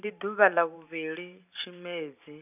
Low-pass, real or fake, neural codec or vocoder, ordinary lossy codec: 3.6 kHz; fake; vocoder, 44.1 kHz, 128 mel bands every 512 samples, BigVGAN v2; none